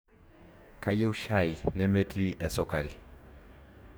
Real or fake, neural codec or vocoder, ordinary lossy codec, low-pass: fake; codec, 44.1 kHz, 2.6 kbps, DAC; none; none